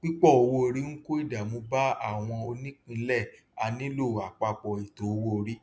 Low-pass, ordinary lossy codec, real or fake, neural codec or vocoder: none; none; real; none